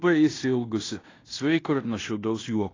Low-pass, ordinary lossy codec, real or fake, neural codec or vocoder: 7.2 kHz; AAC, 32 kbps; fake; codec, 16 kHz in and 24 kHz out, 0.9 kbps, LongCat-Audio-Codec, fine tuned four codebook decoder